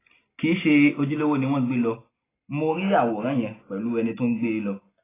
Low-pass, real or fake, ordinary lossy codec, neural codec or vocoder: 3.6 kHz; real; AAC, 16 kbps; none